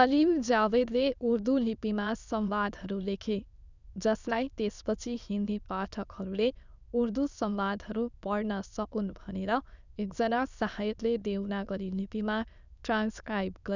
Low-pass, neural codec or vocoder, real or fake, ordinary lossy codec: 7.2 kHz; autoencoder, 22.05 kHz, a latent of 192 numbers a frame, VITS, trained on many speakers; fake; none